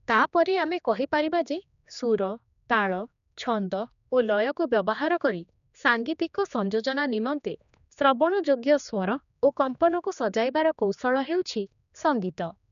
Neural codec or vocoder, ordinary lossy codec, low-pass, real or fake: codec, 16 kHz, 2 kbps, X-Codec, HuBERT features, trained on general audio; none; 7.2 kHz; fake